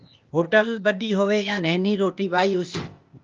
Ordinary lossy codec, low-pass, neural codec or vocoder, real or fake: Opus, 24 kbps; 7.2 kHz; codec, 16 kHz, 0.8 kbps, ZipCodec; fake